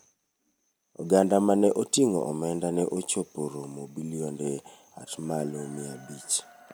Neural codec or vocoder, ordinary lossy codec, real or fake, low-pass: none; none; real; none